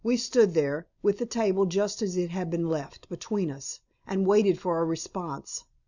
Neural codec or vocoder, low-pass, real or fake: vocoder, 44.1 kHz, 128 mel bands every 256 samples, BigVGAN v2; 7.2 kHz; fake